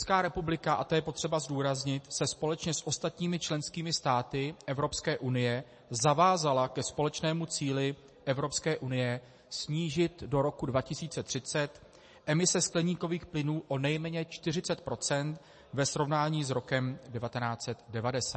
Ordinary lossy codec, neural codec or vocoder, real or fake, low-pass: MP3, 32 kbps; none; real; 9.9 kHz